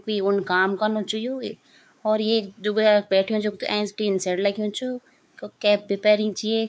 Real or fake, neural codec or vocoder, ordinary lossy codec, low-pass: fake; codec, 16 kHz, 4 kbps, X-Codec, WavLM features, trained on Multilingual LibriSpeech; none; none